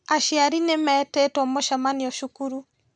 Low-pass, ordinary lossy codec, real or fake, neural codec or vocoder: 9.9 kHz; none; real; none